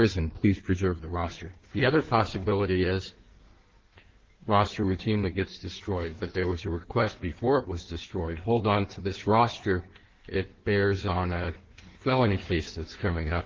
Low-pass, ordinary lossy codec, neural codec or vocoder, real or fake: 7.2 kHz; Opus, 16 kbps; codec, 16 kHz in and 24 kHz out, 1.1 kbps, FireRedTTS-2 codec; fake